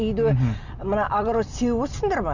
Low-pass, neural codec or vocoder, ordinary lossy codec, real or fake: 7.2 kHz; none; none; real